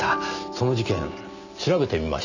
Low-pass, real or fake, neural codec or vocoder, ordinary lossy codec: 7.2 kHz; real; none; none